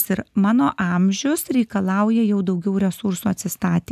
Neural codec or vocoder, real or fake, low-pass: none; real; 14.4 kHz